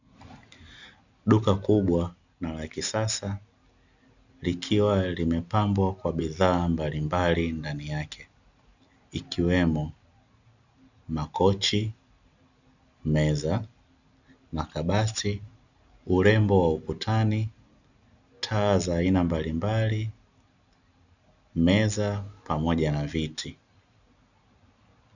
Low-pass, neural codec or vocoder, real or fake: 7.2 kHz; none; real